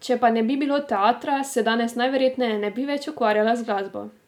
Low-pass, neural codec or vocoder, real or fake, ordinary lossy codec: 19.8 kHz; none; real; none